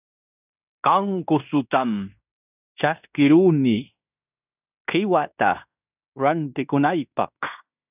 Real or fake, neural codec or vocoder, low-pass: fake; codec, 16 kHz in and 24 kHz out, 0.9 kbps, LongCat-Audio-Codec, fine tuned four codebook decoder; 3.6 kHz